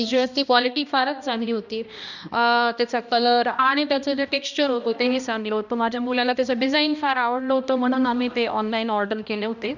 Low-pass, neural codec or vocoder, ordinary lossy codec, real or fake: 7.2 kHz; codec, 16 kHz, 1 kbps, X-Codec, HuBERT features, trained on balanced general audio; none; fake